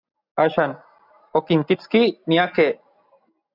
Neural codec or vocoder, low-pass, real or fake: none; 5.4 kHz; real